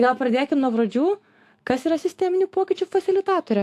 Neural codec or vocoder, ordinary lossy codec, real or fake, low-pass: autoencoder, 48 kHz, 128 numbers a frame, DAC-VAE, trained on Japanese speech; AAC, 64 kbps; fake; 14.4 kHz